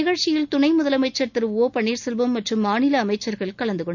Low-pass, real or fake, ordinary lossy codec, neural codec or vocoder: 7.2 kHz; real; none; none